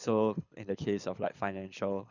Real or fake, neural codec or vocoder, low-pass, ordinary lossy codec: fake; codec, 24 kHz, 6 kbps, HILCodec; 7.2 kHz; none